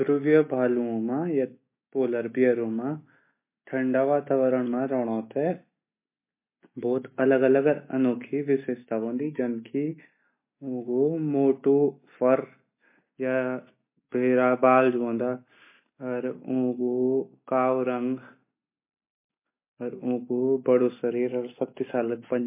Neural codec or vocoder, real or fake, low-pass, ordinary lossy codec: none; real; 3.6 kHz; MP3, 24 kbps